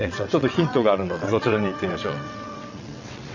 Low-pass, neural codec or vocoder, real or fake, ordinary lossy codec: 7.2 kHz; vocoder, 22.05 kHz, 80 mel bands, WaveNeXt; fake; none